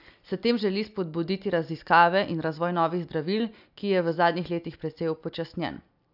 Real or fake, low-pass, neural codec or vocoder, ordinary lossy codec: real; 5.4 kHz; none; none